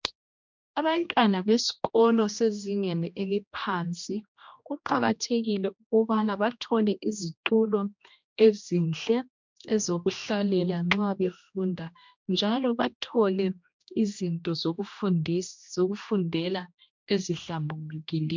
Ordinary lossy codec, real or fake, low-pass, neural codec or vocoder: MP3, 64 kbps; fake; 7.2 kHz; codec, 16 kHz, 1 kbps, X-Codec, HuBERT features, trained on general audio